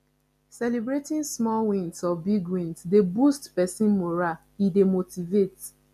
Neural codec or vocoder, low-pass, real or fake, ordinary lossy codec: none; 14.4 kHz; real; none